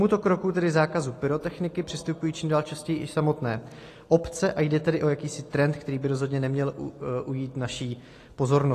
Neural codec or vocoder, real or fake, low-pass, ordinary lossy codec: none; real; 14.4 kHz; AAC, 48 kbps